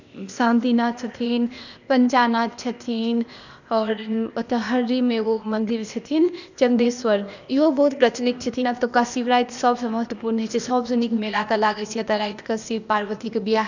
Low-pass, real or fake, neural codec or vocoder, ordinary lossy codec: 7.2 kHz; fake; codec, 16 kHz, 0.8 kbps, ZipCodec; none